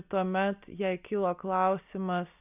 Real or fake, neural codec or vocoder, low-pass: real; none; 3.6 kHz